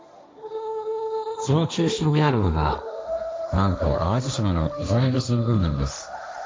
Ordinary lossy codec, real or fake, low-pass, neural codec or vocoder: none; fake; none; codec, 16 kHz, 1.1 kbps, Voila-Tokenizer